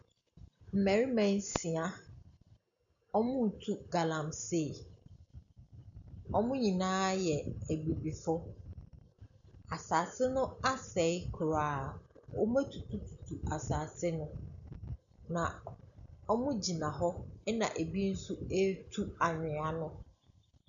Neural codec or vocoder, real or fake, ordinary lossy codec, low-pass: none; real; MP3, 96 kbps; 7.2 kHz